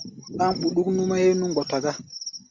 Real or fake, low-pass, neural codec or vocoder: real; 7.2 kHz; none